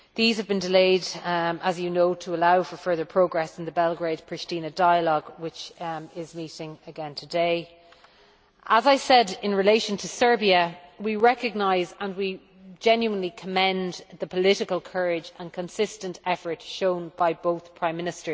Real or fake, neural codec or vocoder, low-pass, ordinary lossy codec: real; none; none; none